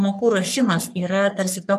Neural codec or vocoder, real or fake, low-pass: codec, 44.1 kHz, 3.4 kbps, Pupu-Codec; fake; 14.4 kHz